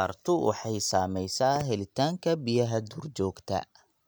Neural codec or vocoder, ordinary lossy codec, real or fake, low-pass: none; none; real; none